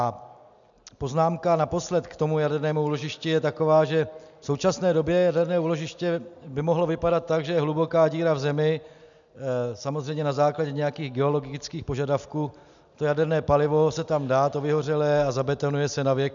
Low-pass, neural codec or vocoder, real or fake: 7.2 kHz; none; real